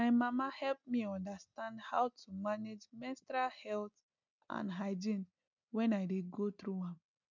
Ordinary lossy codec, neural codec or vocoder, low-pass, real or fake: none; none; 7.2 kHz; real